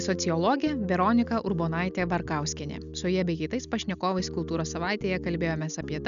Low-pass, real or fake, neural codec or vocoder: 7.2 kHz; real; none